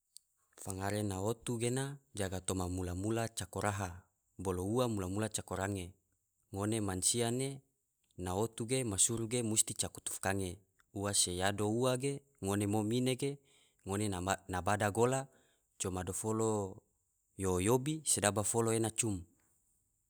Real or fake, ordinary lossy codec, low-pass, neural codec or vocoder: real; none; none; none